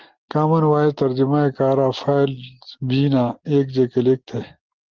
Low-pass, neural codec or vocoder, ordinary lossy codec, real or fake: 7.2 kHz; none; Opus, 16 kbps; real